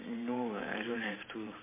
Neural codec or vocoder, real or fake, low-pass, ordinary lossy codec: vocoder, 44.1 kHz, 128 mel bands every 512 samples, BigVGAN v2; fake; 3.6 kHz; MP3, 16 kbps